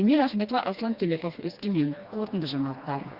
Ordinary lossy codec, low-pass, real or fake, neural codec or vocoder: Opus, 64 kbps; 5.4 kHz; fake; codec, 16 kHz, 2 kbps, FreqCodec, smaller model